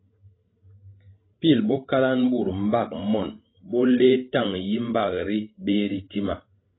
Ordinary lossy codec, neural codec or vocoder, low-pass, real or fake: AAC, 16 kbps; codec, 16 kHz, 16 kbps, FreqCodec, larger model; 7.2 kHz; fake